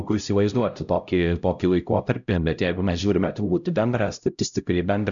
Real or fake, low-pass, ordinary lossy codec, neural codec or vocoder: fake; 7.2 kHz; MP3, 96 kbps; codec, 16 kHz, 0.5 kbps, X-Codec, HuBERT features, trained on LibriSpeech